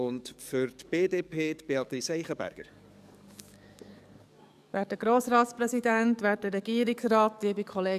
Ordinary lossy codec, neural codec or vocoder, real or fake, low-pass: none; codec, 44.1 kHz, 7.8 kbps, DAC; fake; 14.4 kHz